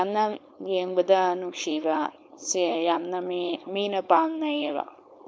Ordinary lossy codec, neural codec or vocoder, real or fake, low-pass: none; codec, 16 kHz, 4.8 kbps, FACodec; fake; none